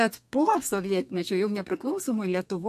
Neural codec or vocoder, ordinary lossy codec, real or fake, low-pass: codec, 32 kHz, 1.9 kbps, SNAC; MP3, 64 kbps; fake; 14.4 kHz